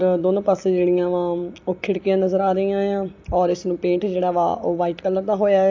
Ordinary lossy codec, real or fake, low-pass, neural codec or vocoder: AAC, 48 kbps; real; 7.2 kHz; none